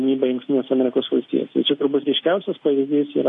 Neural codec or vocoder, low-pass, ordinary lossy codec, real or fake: none; 10.8 kHz; AAC, 48 kbps; real